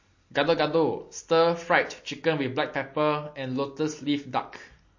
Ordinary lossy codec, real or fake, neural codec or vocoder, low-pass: MP3, 32 kbps; real; none; 7.2 kHz